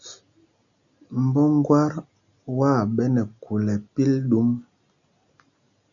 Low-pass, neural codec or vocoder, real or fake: 7.2 kHz; none; real